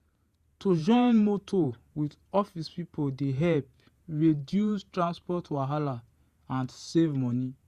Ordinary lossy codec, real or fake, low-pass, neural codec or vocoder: Opus, 64 kbps; fake; 14.4 kHz; vocoder, 48 kHz, 128 mel bands, Vocos